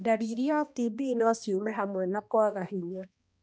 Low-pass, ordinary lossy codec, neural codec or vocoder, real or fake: none; none; codec, 16 kHz, 1 kbps, X-Codec, HuBERT features, trained on balanced general audio; fake